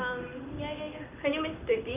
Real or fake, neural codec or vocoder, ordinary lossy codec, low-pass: fake; codec, 16 kHz in and 24 kHz out, 1 kbps, XY-Tokenizer; none; 3.6 kHz